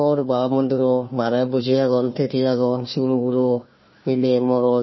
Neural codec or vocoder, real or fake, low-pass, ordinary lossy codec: codec, 16 kHz, 1 kbps, FunCodec, trained on Chinese and English, 50 frames a second; fake; 7.2 kHz; MP3, 24 kbps